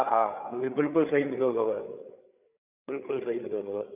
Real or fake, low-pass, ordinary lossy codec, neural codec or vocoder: fake; 3.6 kHz; none; codec, 16 kHz, 8 kbps, FreqCodec, larger model